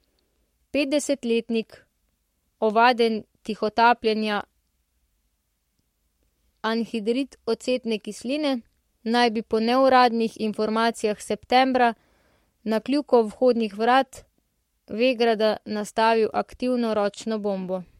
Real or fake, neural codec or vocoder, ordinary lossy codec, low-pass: fake; codec, 44.1 kHz, 7.8 kbps, Pupu-Codec; MP3, 64 kbps; 19.8 kHz